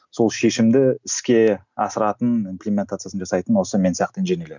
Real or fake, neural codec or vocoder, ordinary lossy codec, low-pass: real; none; none; 7.2 kHz